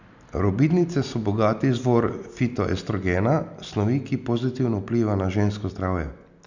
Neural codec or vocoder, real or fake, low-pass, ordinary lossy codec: none; real; 7.2 kHz; none